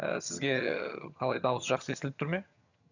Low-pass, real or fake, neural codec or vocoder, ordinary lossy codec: 7.2 kHz; fake; vocoder, 22.05 kHz, 80 mel bands, HiFi-GAN; none